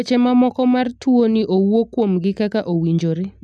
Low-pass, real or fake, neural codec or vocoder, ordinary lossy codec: none; real; none; none